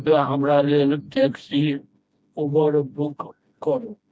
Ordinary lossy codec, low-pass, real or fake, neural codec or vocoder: none; none; fake; codec, 16 kHz, 1 kbps, FreqCodec, smaller model